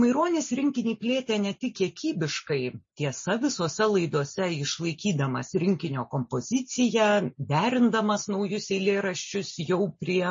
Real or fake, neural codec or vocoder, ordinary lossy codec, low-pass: real; none; MP3, 32 kbps; 7.2 kHz